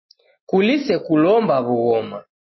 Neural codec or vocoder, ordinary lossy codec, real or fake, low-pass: none; MP3, 24 kbps; real; 7.2 kHz